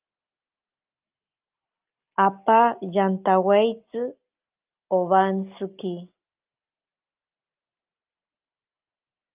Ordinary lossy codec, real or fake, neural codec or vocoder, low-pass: Opus, 24 kbps; real; none; 3.6 kHz